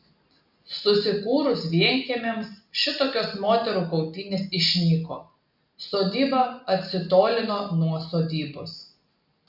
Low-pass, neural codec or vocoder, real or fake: 5.4 kHz; none; real